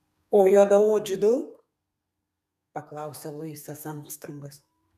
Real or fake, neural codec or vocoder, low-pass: fake; codec, 32 kHz, 1.9 kbps, SNAC; 14.4 kHz